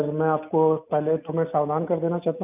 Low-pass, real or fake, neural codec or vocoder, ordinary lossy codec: 3.6 kHz; real; none; none